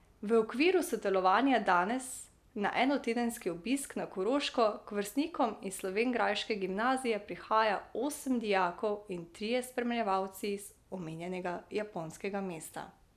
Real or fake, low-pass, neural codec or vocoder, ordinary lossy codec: real; 14.4 kHz; none; none